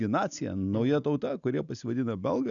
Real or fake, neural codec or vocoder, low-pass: real; none; 7.2 kHz